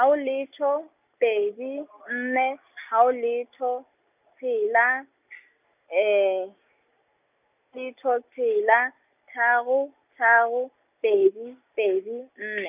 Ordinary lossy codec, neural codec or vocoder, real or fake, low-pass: none; none; real; 3.6 kHz